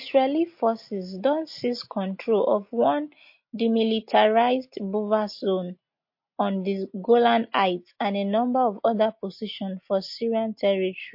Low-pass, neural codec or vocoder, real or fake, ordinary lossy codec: 5.4 kHz; none; real; MP3, 32 kbps